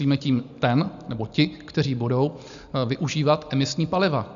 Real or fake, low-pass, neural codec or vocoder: real; 7.2 kHz; none